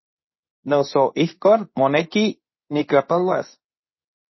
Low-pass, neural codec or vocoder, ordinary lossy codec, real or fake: 7.2 kHz; codec, 24 kHz, 0.9 kbps, WavTokenizer, medium speech release version 2; MP3, 24 kbps; fake